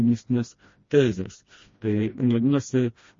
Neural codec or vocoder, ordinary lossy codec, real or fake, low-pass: codec, 16 kHz, 1 kbps, FreqCodec, smaller model; MP3, 32 kbps; fake; 7.2 kHz